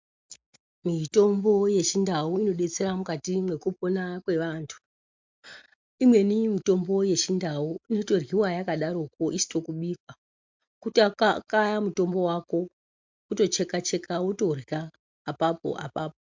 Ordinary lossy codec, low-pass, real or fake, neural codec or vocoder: MP3, 64 kbps; 7.2 kHz; real; none